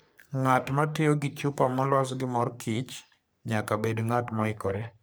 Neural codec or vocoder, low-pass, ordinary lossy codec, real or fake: codec, 44.1 kHz, 3.4 kbps, Pupu-Codec; none; none; fake